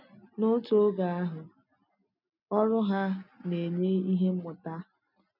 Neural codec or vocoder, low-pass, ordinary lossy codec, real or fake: none; 5.4 kHz; none; real